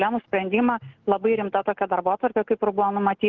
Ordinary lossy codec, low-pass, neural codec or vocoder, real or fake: Opus, 16 kbps; 7.2 kHz; none; real